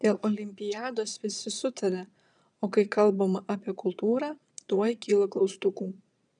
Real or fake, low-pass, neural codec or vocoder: fake; 9.9 kHz; vocoder, 22.05 kHz, 80 mel bands, Vocos